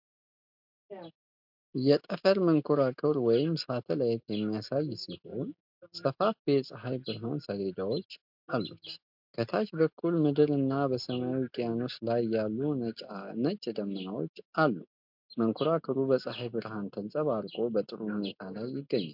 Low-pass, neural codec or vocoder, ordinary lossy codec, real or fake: 5.4 kHz; none; MP3, 48 kbps; real